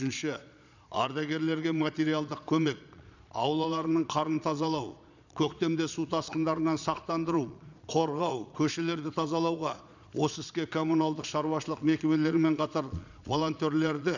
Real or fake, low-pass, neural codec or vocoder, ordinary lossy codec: fake; 7.2 kHz; vocoder, 22.05 kHz, 80 mel bands, Vocos; none